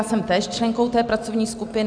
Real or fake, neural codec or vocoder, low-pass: real; none; 9.9 kHz